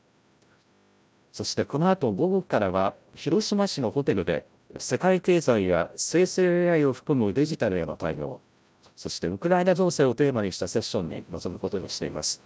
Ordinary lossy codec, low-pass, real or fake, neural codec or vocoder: none; none; fake; codec, 16 kHz, 0.5 kbps, FreqCodec, larger model